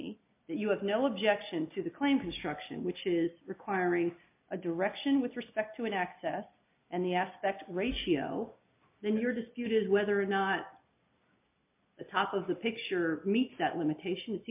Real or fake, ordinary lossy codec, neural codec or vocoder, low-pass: real; MP3, 32 kbps; none; 3.6 kHz